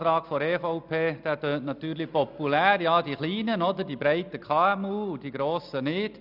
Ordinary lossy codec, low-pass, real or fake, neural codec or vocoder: none; 5.4 kHz; real; none